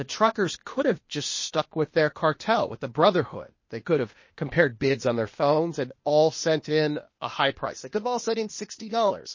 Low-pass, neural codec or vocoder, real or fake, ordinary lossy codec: 7.2 kHz; codec, 16 kHz, 0.8 kbps, ZipCodec; fake; MP3, 32 kbps